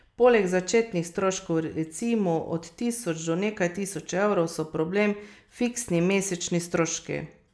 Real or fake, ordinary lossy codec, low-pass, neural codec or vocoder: real; none; none; none